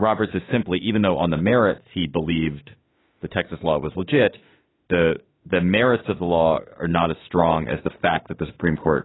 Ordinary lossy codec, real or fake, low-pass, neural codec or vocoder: AAC, 16 kbps; fake; 7.2 kHz; autoencoder, 48 kHz, 32 numbers a frame, DAC-VAE, trained on Japanese speech